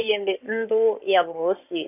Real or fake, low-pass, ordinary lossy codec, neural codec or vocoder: fake; 3.6 kHz; none; codec, 16 kHz in and 24 kHz out, 2.2 kbps, FireRedTTS-2 codec